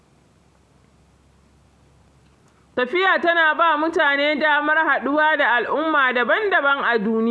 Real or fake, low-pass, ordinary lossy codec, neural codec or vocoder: real; none; none; none